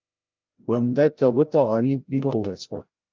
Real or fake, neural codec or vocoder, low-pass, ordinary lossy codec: fake; codec, 16 kHz, 0.5 kbps, FreqCodec, larger model; 7.2 kHz; Opus, 32 kbps